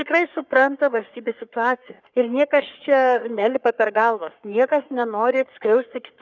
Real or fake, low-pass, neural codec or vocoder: fake; 7.2 kHz; codec, 44.1 kHz, 3.4 kbps, Pupu-Codec